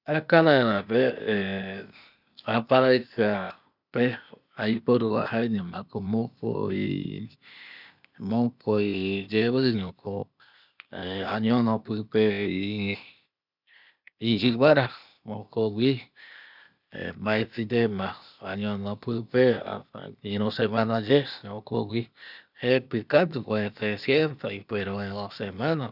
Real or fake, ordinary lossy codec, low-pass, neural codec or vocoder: fake; none; 5.4 kHz; codec, 16 kHz, 0.8 kbps, ZipCodec